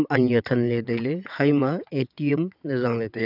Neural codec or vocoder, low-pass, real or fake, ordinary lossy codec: vocoder, 22.05 kHz, 80 mel bands, WaveNeXt; 5.4 kHz; fake; none